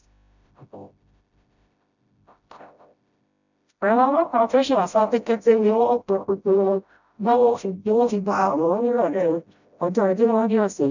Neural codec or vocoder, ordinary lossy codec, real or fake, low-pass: codec, 16 kHz, 0.5 kbps, FreqCodec, smaller model; none; fake; 7.2 kHz